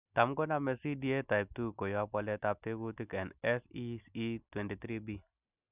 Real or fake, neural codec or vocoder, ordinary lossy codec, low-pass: real; none; none; 3.6 kHz